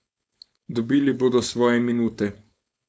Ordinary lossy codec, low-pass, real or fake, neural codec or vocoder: none; none; fake; codec, 16 kHz, 4.8 kbps, FACodec